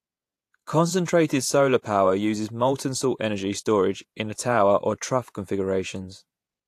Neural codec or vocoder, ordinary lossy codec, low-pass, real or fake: vocoder, 48 kHz, 128 mel bands, Vocos; AAC, 64 kbps; 14.4 kHz; fake